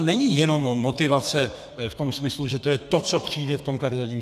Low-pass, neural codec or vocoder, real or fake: 14.4 kHz; codec, 32 kHz, 1.9 kbps, SNAC; fake